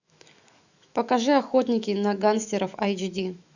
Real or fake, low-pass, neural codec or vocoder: fake; 7.2 kHz; autoencoder, 48 kHz, 128 numbers a frame, DAC-VAE, trained on Japanese speech